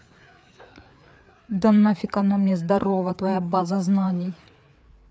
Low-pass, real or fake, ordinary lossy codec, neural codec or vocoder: none; fake; none; codec, 16 kHz, 4 kbps, FreqCodec, larger model